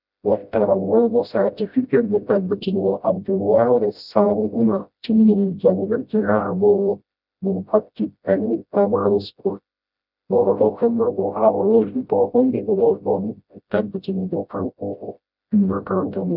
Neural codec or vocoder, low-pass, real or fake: codec, 16 kHz, 0.5 kbps, FreqCodec, smaller model; 5.4 kHz; fake